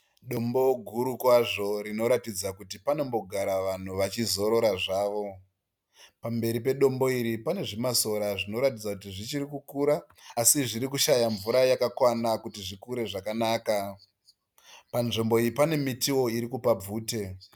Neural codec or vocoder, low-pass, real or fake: none; 19.8 kHz; real